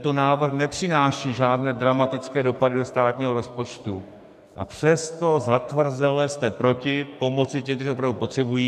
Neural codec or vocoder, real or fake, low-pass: codec, 44.1 kHz, 2.6 kbps, SNAC; fake; 14.4 kHz